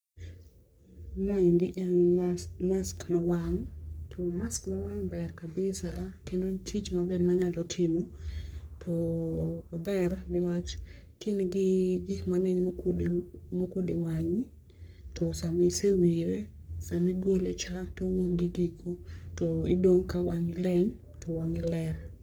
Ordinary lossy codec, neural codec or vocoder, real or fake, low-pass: none; codec, 44.1 kHz, 3.4 kbps, Pupu-Codec; fake; none